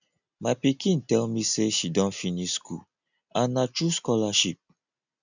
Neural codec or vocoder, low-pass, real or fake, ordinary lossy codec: none; 7.2 kHz; real; none